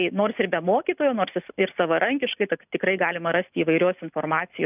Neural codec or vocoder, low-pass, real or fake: none; 3.6 kHz; real